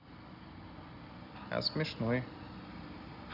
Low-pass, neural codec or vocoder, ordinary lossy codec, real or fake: 5.4 kHz; none; none; real